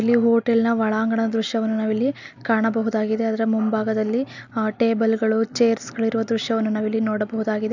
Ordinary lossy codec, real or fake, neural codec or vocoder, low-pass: none; real; none; 7.2 kHz